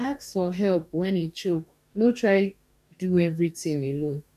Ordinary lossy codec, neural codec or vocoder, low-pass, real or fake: none; codec, 44.1 kHz, 2.6 kbps, DAC; 14.4 kHz; fake